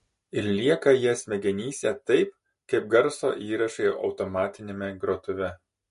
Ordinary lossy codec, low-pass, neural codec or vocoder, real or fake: MP3, 48 kbps; 10.8 kHz; none; real